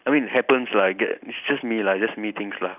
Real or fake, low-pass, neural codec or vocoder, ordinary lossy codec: real; 3.6 kHz; none; none